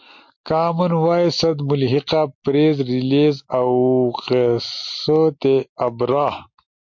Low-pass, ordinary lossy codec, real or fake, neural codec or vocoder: 7.2 kHz; MP3, 48 kbps; real; none